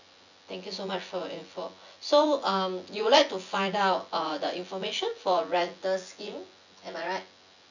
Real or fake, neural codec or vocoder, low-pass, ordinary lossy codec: fake; vocoder, 24 kHz, 100 mel bands, Vocos; 7.2 kHz; none